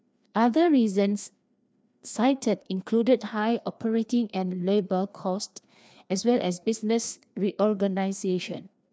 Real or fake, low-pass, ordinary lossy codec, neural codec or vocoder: fake; none; none; codec, 16 kHz, 2 kbps, FreqCodec, larger model